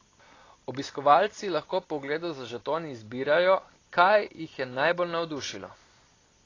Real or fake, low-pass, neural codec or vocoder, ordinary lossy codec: real; 7.2 kHz; none; AAC, 32 kbps